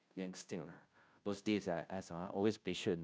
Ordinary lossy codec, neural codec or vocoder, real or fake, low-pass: none; codec, 16 kHz, 0.5 kbps, FunCodec, trained on Chinese and English, 25 frames a second; fake; none